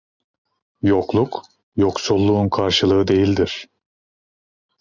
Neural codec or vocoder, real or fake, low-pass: none; real; 7.2 kHz